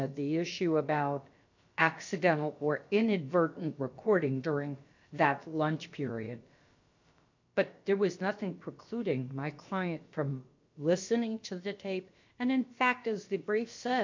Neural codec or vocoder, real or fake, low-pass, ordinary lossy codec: codec, 16 kHz, about 1 kbps, DyCAST, with the encoder's durations; fake; 7.2 kHz; MP3, 48 kbps